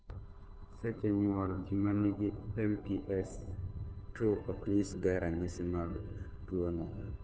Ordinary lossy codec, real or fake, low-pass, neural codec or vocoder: none; fake; none; codec, 16 kHz, 2 kbps, FunCodec, trained on Chinese and English, 25 frames a second